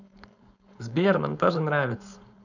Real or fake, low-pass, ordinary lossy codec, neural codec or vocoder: fake; 7.2 kHz; none; codec, 16 kHz, 4.8 kbps, FACodec